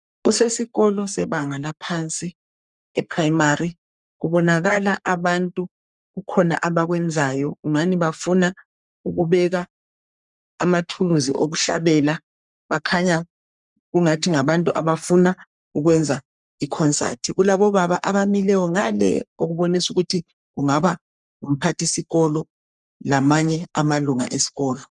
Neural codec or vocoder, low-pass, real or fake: codec, 44.1 kHz, 3.4 kbps, Pupu-Codec; 10.8 kHz; fake